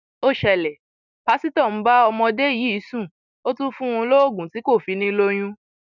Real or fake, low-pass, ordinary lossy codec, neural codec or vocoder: real; 7.2 kHz; none; none